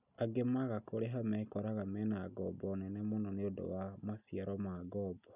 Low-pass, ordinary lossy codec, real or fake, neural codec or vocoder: 3.6 kHz; none; real; none